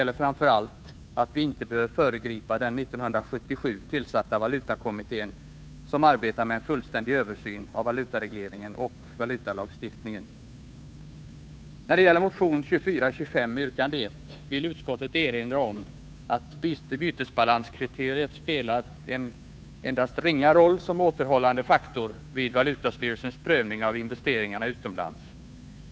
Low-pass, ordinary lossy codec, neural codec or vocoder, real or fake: none; none; codec, 16 kHz, 2 kbps, FunCodec, trained on Chinese and English, 25 frames a second; fake